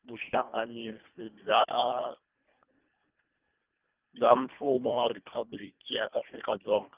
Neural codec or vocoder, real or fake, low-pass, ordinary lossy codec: codec, 24 kHz, 1.5 kbps, HILCodec; fake; 3.6 kHz; Opus, 24 kbps